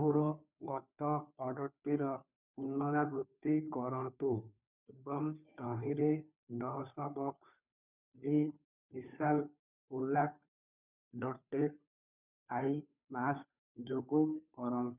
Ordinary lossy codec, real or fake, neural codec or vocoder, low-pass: none; fake; codec, 16 kHz, 2 kbps, FunCodec, trained on Chinese and English, 25 frames a second; 3.6 kHz